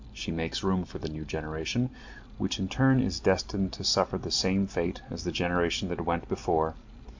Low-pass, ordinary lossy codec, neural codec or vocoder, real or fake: 7.2 kHz; MP3, 64 kbps; none; real